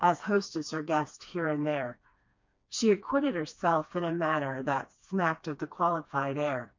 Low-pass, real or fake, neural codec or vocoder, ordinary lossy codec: 7.2 kHz; fake; codec, 16 kHz, 2 kbps, FreqCodec, smaller model; MP3, 48 kbps